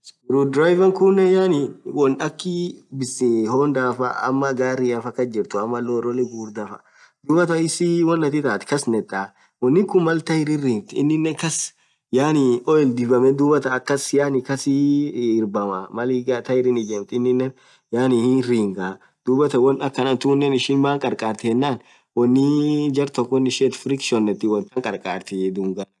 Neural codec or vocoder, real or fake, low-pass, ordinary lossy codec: none; real; none; none